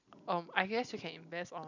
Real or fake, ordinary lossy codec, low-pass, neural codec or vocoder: real; none; 7.2 kHz; none